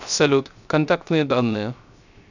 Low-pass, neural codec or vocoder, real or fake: 7.2 kHz; codec, 16 kHz, 0.3 kbps, FocalCodec; fake